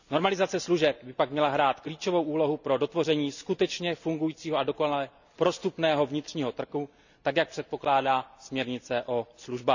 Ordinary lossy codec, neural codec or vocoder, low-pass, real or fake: none; none; 7.2 kHz; real